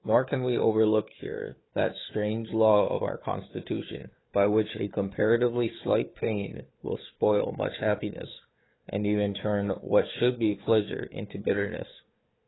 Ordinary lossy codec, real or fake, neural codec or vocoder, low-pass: AAC, 16 kbps; fake; codec, 16 kHz, 4 kbps, FunCodec, trained on Chinese and English, 50 frames a second; 7.2 kHz